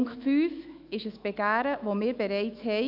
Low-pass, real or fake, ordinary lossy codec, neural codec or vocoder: 5.4 kHz; fake; AAC, 48 kbps; autoencoder, 48 kHz, 128 numbers a frame, DAC-VAE, trained on Japanese speech